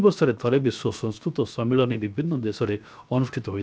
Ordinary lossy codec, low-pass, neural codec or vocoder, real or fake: none; none; codec, 16 kHz, 0.7 kbps, FocalCodec; fake